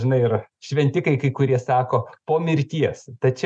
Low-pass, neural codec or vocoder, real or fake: 9.9 kHz; none; real